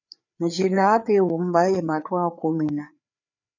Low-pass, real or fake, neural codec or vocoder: 7.2 kHz; fake; codec, 16 kHz, 4 kbps, FreqCodec, larger model